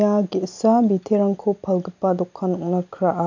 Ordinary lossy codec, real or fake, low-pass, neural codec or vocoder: none; real; 7.2 kHz; none